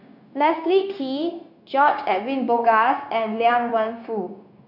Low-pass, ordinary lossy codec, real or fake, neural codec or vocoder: 5.4 kHz; none; fake; codec, 16 kHz, 0.9 kbps, LongCat-Audio-Codec